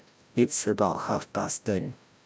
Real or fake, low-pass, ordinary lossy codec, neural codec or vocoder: fake; none; none; codec, 16 kHz, 0.5 kbps, FreqCodec, larger model